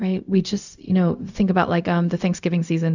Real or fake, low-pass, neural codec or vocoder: fake; 7.2 kHz; codec, 16 kHz, 0.4 kbps, LongCat-Audio-Codec